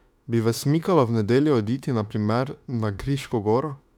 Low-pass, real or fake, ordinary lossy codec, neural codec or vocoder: 19.8 kHz; fake; none; autoencoder, 48 kHz, 32 numbers a frame, DAC-VAE, trained on Japanese speech